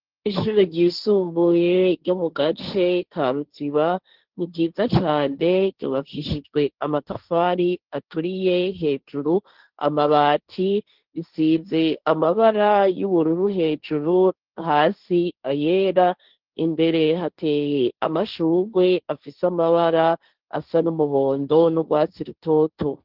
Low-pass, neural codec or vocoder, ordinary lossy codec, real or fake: 5.4 kHz; codec, 16 kHz, 1.1 kbps, Voila-Tokenizer; Opus, 16 kbps; fake